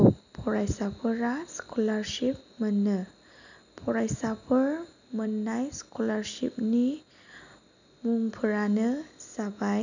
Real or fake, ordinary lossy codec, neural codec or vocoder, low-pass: real; none; none; 7.2 kHz